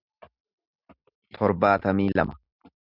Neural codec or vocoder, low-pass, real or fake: none; 5.4 kHz; real